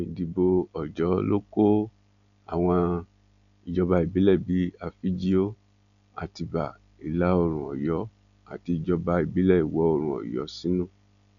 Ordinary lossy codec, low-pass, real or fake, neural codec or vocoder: none; 7.2 kHz; real; none